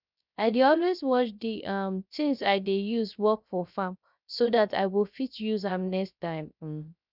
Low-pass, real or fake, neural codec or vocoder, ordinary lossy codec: 5.4 kHz; fake; codec, 16 kHz, 0.3 kbps, FocalCodec; none